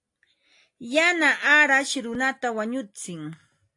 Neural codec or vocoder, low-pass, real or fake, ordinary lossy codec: none; 10.8 kHz; real; AAC, 48 kbps